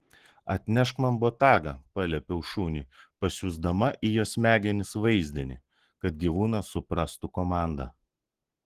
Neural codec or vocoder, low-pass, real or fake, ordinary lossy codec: codec, 44.1 kHz, 7.8 kbps, Pupu-Codec; 14.4 kHz; fake; Opus, 16 kbps